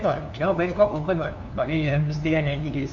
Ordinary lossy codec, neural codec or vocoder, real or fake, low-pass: none; codec, 16 kHz, 2 kbps, FunCodec, trained on LibriTTS, 25 frames a second; fake; 7.2 kHz